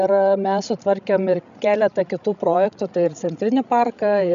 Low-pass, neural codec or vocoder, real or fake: 7.2 kHz; codec, 16 kHz, 16 kbps, FreqCodec, larger model; fake